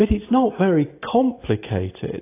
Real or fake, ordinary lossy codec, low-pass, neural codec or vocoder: real; AAC, 24 kbps; 3.6 kHz; none